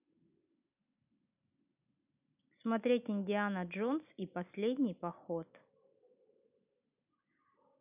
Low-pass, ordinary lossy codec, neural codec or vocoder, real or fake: 3.6 kHz; none; none; real